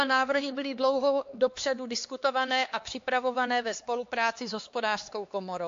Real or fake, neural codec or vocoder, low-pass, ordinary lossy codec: fake; codec, 16 kHz, 2 kbps, X-Codec, HuBERT features, trained on LibriSpeech; 7.2 kHz; AAC, 48 kbps